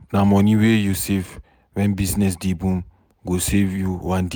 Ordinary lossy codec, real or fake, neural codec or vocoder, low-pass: none; real; none; none